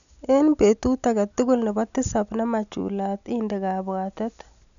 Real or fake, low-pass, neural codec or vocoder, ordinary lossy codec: real; 7.2 kHz; none; none